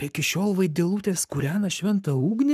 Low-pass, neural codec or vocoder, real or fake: 14.4 kHz; codec, 44.1 kHz, 7.8 kbps, Pupu-Codec; fake